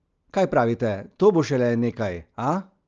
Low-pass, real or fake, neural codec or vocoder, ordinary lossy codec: 7.2 kHz; real; none; Opus, 24 kbps